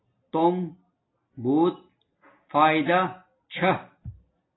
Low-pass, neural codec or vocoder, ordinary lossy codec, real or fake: 7.2 kHz; none; AAC, 16 kbps; real